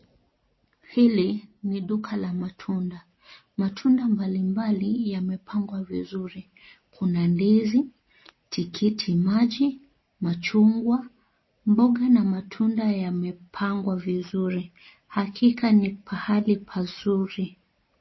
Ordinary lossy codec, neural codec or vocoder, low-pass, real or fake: MP3, 24 kbps; none; 7.2 kHz; real